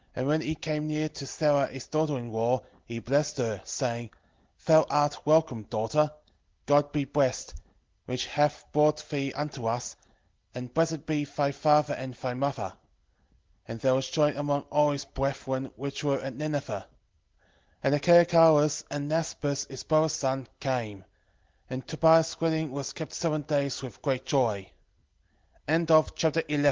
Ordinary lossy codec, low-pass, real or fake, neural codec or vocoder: Opus, 16 kbps; 7.2 kHz; real; none